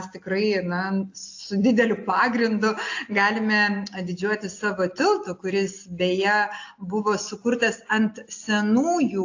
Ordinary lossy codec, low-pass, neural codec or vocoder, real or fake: AAC, 48 kbps; 7.2 kHz; none; real